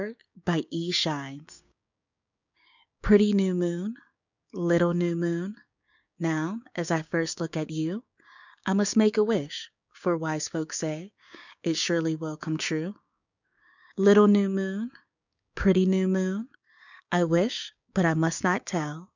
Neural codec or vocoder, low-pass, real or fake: codec, 16 kHz, 6 kbps, DAC; 7.2 kHz; fake